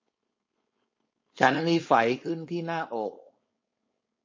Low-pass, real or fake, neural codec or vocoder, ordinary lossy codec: 7.2 kHz; fake; codec, 16 kHz, 4.8 kbps, FACodec; MP3, 32 kbps